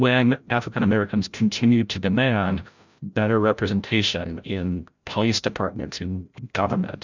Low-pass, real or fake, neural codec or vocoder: 7.2 kHz; fake; codec, 16 kHz, 0.5 kbps, FreqCodec, larger model